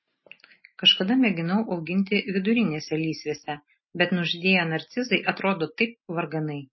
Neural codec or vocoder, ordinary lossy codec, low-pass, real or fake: none; MP3, 24 kbps; 7.2 kHz; real